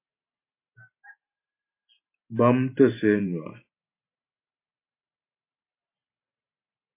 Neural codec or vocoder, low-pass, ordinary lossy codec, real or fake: vocoder, 44.1 kHz, 128 mel bands every 512 samples, BigVGAN v2; 3.6 kHz; MP3, 24 kbps; fake